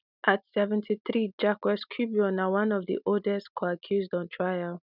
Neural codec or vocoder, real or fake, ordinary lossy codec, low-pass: none; real; none; 5.4 kHz